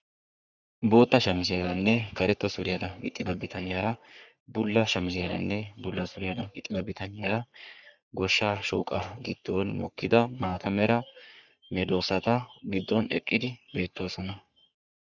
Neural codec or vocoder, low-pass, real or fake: codec, 44.1 kHz, 3.4 kbps, Pupu-Codec; 7.2 kHz; fake